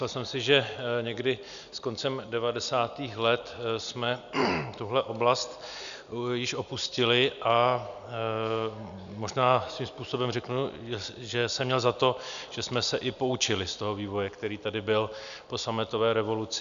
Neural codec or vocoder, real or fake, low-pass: none; real; 7.2 kHz